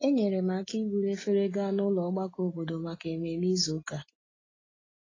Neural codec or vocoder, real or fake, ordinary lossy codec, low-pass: none; real; AAC, 32 kbps; 7.2 kHz